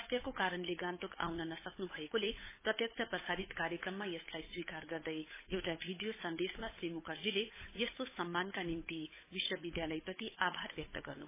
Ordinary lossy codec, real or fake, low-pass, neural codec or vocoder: MP3, 16 kbps; fake; 3.6 kHz; codec, 16 kHz, 8 kbps, FunCodec, trained on Chinese and English, 25 frames a second